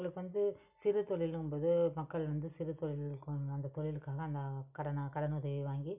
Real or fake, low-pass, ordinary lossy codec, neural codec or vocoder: real; 3.6 kHz; none; none